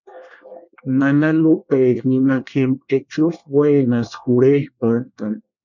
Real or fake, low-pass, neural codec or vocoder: fake; 7.2 kHz; codec, 24 kHz, 1 kbps, SNAC